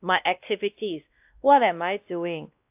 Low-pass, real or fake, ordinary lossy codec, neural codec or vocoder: 3.6 kHz; fake; AAC, 32 kbps; codec, 16 kHz, 1 kbps, X-Codec, WavLM features, trained on Multilingual LibriSpeech